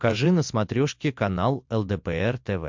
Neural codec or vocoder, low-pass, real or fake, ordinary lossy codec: none; 7.2 kHz; real; MP3, 64 kbps